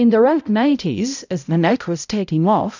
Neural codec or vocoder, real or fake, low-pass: codec, 16 kHz, 0.5 kbps, X-Codec, HuBERT features, trained on balanced general audio; fake; 7.2 kHz